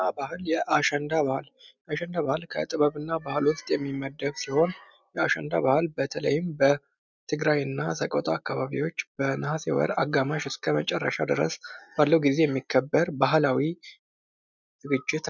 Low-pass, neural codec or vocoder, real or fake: 7.2 kHz; none; real